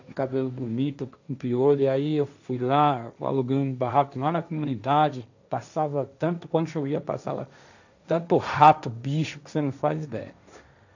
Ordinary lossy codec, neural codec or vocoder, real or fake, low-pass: none; codec, 16 kHz, 1.1 kbps, Voila-Tokenizer; fake; 7.2 kHz